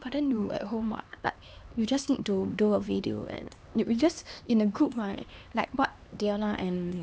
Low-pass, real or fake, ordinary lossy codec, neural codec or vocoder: none; fake; none; codec, 16 kHz, 2 kbps, X-Codec, HuBERT features, trained on LibriSpeech